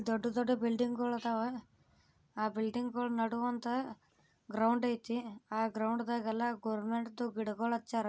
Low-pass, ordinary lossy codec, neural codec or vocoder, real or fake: none; none; none; real